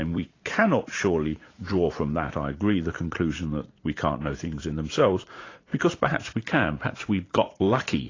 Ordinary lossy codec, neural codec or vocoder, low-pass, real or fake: AAC, 32 kbps; vocoder, 44.1 kHz, 80 mel bands, Vocos; 7.2 kHz; fake